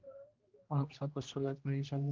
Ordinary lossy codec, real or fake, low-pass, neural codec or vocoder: Opus, 32 kbps; fake; 7.2 kHz; codec, 16 kHz, 1 kbps, X-Codec, HuBERT features, trained on general audio